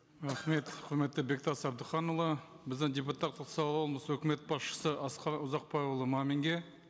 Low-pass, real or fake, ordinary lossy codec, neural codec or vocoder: none; real; none; none